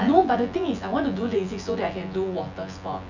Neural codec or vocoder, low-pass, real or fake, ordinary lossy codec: vocoder, 24 kHz, 100 mel bands, Vocos; 7.2 kHz; fake; none